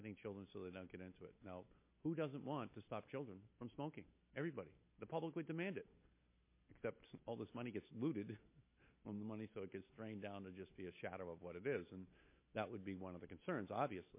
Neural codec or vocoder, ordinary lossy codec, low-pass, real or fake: none; MP3, 24 kbps; 3.6 kHz; real